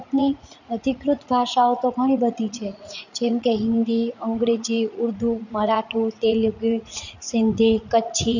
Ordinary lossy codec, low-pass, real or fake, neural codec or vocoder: none; 7.2 kHz; fake; vocoder, 44.1 kHz, 80 mel bands, Vocos